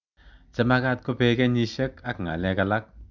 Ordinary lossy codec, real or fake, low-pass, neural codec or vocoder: none; real; 7.2 kHz; none